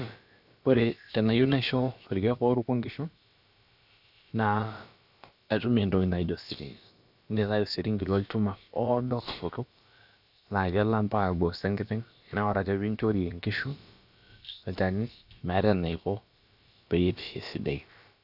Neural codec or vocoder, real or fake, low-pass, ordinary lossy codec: codec, 16 kHz, about 1 kbps, DyCAST, with the encoder's durations; fake; 5.4 kHz; none